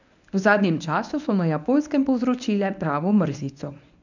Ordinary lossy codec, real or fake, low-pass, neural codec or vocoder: none; fake; 7.2 kHz; codec, 24 kHz, 0.9 kbps, WavTokenizer, medium speech release version 1